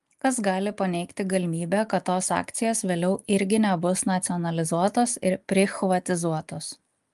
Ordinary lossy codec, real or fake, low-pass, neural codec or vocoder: Opus, 32 kbps; real; 14.4 kHz; none